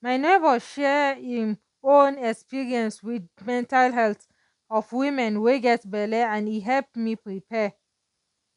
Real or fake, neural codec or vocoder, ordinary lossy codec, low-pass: real; none; none; 10.8 kHz